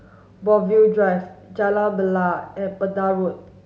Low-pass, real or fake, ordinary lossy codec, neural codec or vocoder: none; real; none; none